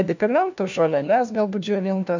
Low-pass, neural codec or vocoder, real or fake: 7.2 kHz; codec, 16 kHz, 1 kbps, FunCodec, trained on LibriTTS, 50 frames a second; fake